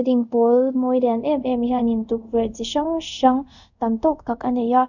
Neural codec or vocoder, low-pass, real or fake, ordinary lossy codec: codec, 24 kHz, 0.5 kbps, DualCodec; 7.2 kHz; fake; none